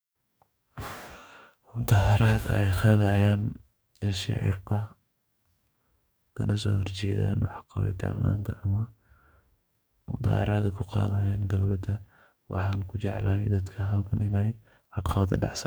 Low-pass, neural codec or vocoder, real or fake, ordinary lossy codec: none; codec, 44.1 kHz, 2.6 kbps, DAC; fake; none